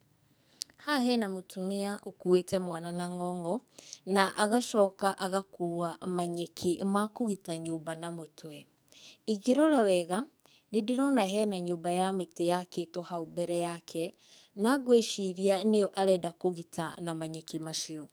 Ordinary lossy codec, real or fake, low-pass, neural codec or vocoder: none; fake; none; codec, 44.1 kHz, 2.6 kbps, SNAC